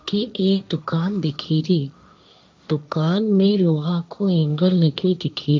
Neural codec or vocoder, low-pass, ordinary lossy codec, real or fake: codec, 16 kHz, 1.1 kbps, Voila-Tokenizer; none; none; fake